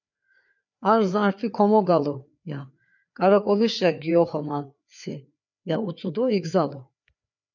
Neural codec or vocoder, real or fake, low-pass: codec, 16 kHz, 4 kbps, FreqCodec, larger model; fake; 7.2 kHz